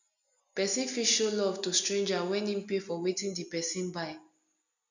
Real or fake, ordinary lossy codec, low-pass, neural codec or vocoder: real; none; 7.2 kHz; none